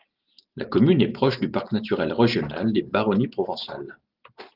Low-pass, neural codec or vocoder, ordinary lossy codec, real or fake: 5.4 kHz; none; Opus, 32 kbps; real